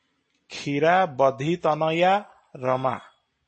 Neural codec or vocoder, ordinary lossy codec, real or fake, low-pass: none; MP3, 32 kbps; real; 9.9 kHz